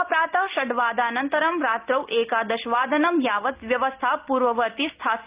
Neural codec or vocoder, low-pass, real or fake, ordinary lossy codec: none; 3.6 kHz; real; Opus, 24 kbps